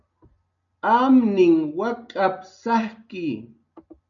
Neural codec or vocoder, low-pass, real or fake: none; 7.2 kHz; real